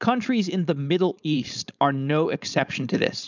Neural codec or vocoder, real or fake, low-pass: codec, 16 kHz, 4.8 kbps, FACodec; fake; 7.2 kHz